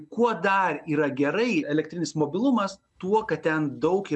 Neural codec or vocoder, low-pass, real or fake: none; 9.9 kHz; real